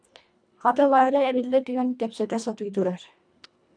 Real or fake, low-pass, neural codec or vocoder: fake; 9.9 kHz; codec, 24 kHz, 1.5 kbps, HILCodec